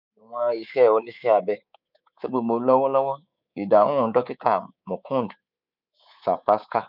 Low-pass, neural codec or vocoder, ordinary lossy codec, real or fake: 5.4 kHz; codec, 24 kHz, 3.1 kbps, DualCodec; none; fake